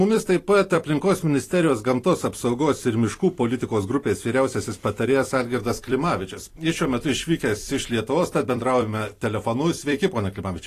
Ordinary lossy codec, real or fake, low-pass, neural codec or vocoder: AAC, 48 kbps; fake; 14.4 kHz; vocoder, 44.1 kHz, 128 mel bands every 256 samples, BigVGAN v2